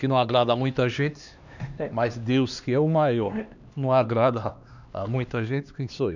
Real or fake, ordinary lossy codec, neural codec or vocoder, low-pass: fake; none; codec, 16 kHz, 2 kbps, X-Codec, HuBERT features, trained on LibriSpeech; 7.2 kHz